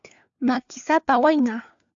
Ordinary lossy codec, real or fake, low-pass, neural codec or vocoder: AAC, 64 kbps; fake; 7.2 kHz; codec, 16 kHz, 4 kbps, FunCodec, trained on LibriTTS, 50 frames a second